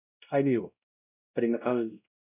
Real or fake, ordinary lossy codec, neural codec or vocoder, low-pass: fake; none; codec, 16 kHz, 0.5 kbps, X-Codec, WavLM features, trained on Multilingual LibriSpeech; 3.6 kHz